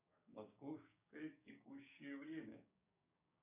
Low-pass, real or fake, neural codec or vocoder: 3.6 kHz; fake; codec, 16 kHz, 6 kbps, DAC